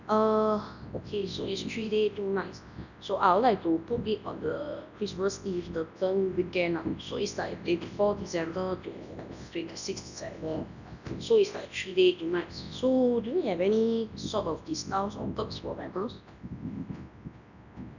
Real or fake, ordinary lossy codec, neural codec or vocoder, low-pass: fake; none; codec, 24 kHz, 0.9 kbps, WavTokenizer, large speech release; 7.2 kHz